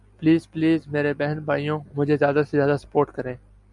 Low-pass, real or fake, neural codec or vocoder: 10.8 kHz; real; none